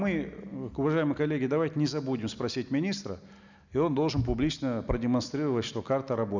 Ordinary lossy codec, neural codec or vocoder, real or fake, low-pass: none; none; real; 7.2 kHz